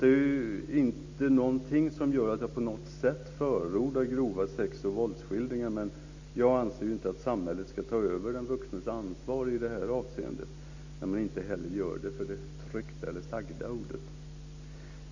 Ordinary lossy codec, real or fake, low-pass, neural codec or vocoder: AAC, 48 kbps; real; 7.2 kHz; none